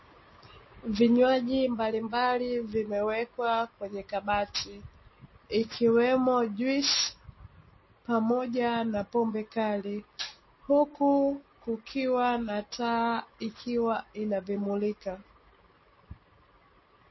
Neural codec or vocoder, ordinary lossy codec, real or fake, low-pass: none; MP3, 24 kbps; real; 7.2 kHz